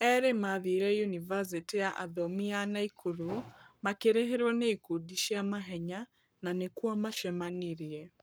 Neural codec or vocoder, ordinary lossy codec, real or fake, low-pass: codec, 44.1 kHz, 7.8 kbps, Pupu-Codec; none; fake; none